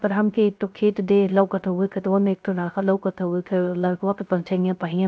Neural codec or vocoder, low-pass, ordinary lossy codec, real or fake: codec, 16 kHz, 0.3 kbps, FocalCodec; none; none; fake